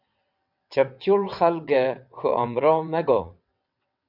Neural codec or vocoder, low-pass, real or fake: vocoder, 22.05 kHz, 80 mel bands, WaveNeXt; 5.4 kHz; fake